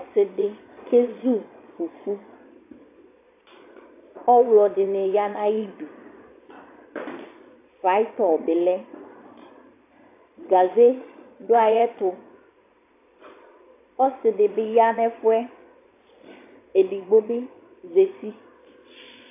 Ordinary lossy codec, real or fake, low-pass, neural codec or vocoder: AAC, 24 kbps; fake; 3.6 kHz; vocoder, 22.05 kHz, 80 mel bands, Vocos